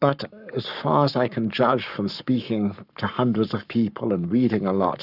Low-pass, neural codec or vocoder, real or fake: 5.4 kHz; codec, 44.1 kHz, 7.8 kbps, Pupu-Codec; fake